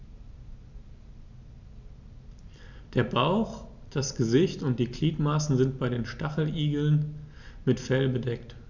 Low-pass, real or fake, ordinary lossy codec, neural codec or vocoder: 7.2 kHz; real; none; none